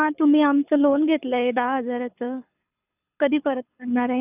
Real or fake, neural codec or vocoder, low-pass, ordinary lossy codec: fake; codec, 24 kHz, 6 kbps, HILCodec; 3.6 kHz; none